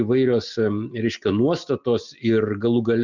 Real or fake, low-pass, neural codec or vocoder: real; 7.2 kHz; none